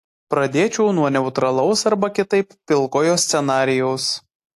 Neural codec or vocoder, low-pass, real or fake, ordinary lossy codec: none; 14.4 kHz; real; AAC, 64 kbps